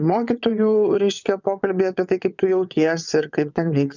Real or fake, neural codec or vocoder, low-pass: fake; vocoder, 22.05 kHz, 80 mel bands, WaveNeXt; 7.2 kHz